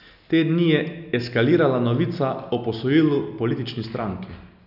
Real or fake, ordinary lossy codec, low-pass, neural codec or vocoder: real; none; 5.4 kHz; none